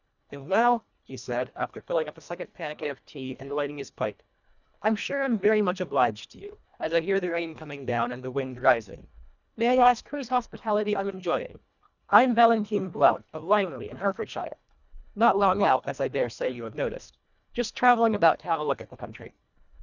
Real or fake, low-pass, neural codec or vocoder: fake; 7.2 kHz; codec, 24 kHz, 1.5 kbps, HILCodec